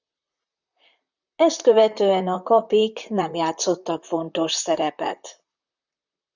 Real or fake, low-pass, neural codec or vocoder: fake; 7.2 kHz; vocoder, 44.1 kHz, 128 mel bands, Pupu-Vocoder